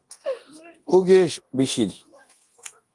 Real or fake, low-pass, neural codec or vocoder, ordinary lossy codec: fake; 10.8 kHz; codec, 24 kHz, 0.9 kbps, DualCodec; Opus, 24 kbps